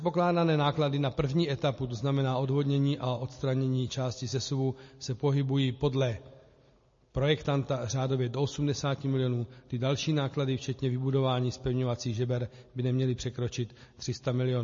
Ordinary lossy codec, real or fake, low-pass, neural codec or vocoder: MP3, 32 kbps; real; 7.2 kHz; none